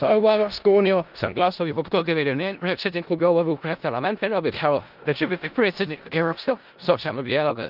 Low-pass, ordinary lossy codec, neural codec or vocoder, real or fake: 5.4 kHz; Opus, 32 kbps; codec, 16 kHz in and 24 kHz out, 0.4 kbps, LongCat-Audio-Codec, four codebook decoder; fake